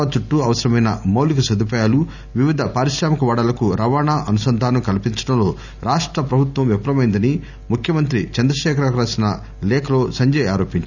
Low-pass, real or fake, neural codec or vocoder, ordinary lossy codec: 7.2 kHz; real; none; none